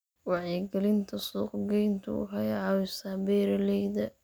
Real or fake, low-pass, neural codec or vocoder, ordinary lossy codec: real; none; none; none